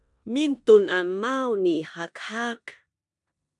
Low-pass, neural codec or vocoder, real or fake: 10.8 kHz; codec, 16 kHz in and 24 kHz out, 0.9 kbps, LongCat-Audio-Codec, four codebook decoder; fake